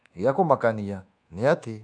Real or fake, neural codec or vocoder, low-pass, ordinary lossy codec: fake; codec, 24 kHz, 1.2 kbps, DualCodec; 9.9 kHz; none